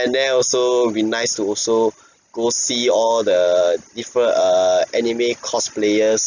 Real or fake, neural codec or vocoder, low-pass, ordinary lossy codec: real; none; 7.2 kHz; none